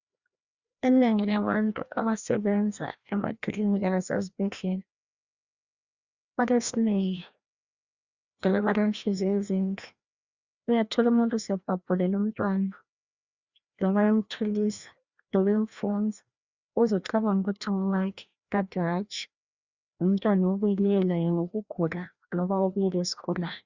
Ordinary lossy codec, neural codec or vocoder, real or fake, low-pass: Opus, 64 kbps; codec, 16 kHz, 1 kbps, FreqCodec, larger model; fake; 7.2 kHz